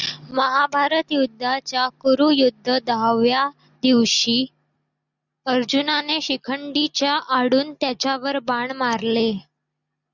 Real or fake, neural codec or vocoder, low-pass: real; none; 7.2 kHz